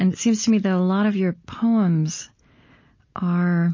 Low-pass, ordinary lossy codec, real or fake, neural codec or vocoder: 7.2 kHz; MP3, 32 kbps; real; none